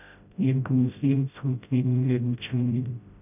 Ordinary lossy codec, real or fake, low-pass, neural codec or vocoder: none; fake; 3.6 kHz; codec, 16 kHz, 0.5 kbps, FreqCodec, smaller model